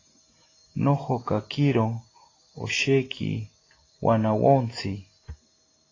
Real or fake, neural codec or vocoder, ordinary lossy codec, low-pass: real; none; AAC, 32 kbps; 7.2 kHz